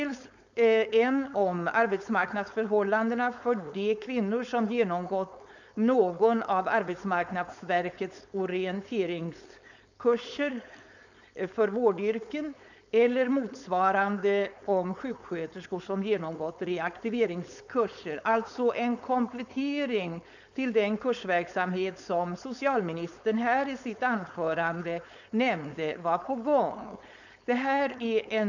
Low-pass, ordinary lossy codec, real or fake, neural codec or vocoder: 7.2 kHz; none; fake; codec, 16 kHz, 4.8 kbps, FACodec